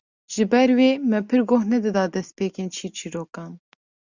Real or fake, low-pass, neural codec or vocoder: real; 7.2 kHz; none